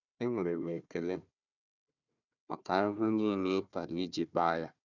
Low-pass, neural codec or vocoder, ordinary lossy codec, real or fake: 7.2 kHz; codec, 16 kHz, 1 kbps, FunCodec, trained on Chinese and English, 50 frames a second; none; fake